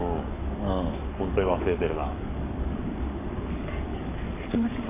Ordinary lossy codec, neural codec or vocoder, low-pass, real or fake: AAC, 24 kbps; codec, 44.1 kHz, 7.8 kbps, DAC; 3.6 kHz; fake